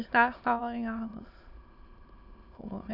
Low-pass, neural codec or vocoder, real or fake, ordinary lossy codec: 5.4 kHz; autoencoder, 22.05 kHz, a latent of 192 numbers a frame, VITS, trained on many speakers; fake; none